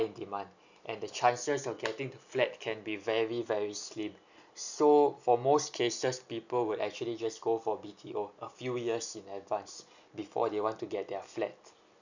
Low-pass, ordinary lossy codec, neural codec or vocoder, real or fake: 7.2 kHz; none; none; real